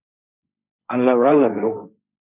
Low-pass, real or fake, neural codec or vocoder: 3.6 kHz; fake; codec, 16 kHz, 1.1 kbps, Voila-Tokenizer